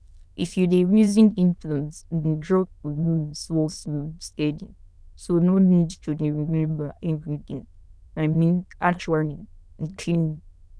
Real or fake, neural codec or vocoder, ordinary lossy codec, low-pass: fake; autoencoder, 22.05 kHz, a latent of 192 numbers a frame, VITS, trained on many speakers; none; none